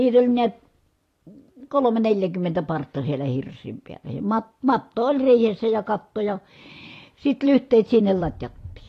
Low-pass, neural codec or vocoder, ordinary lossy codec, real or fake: 14.4 kHz; vocoder, 44.1 kHz, 128 mel bands every 256 samples, BigVGAN v2; AAC, 48 kbps; fake